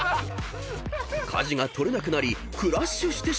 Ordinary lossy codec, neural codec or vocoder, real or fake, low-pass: none; none; real; none